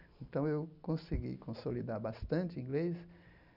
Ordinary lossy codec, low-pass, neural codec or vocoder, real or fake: none; 5.4 kHz; none; real